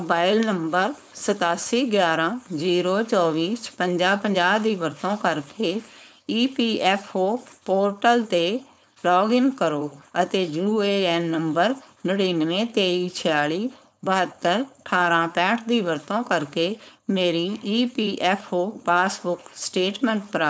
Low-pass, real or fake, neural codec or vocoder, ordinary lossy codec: none; fake; codec, 16 kHz, 4.8 kbps, FACodec; none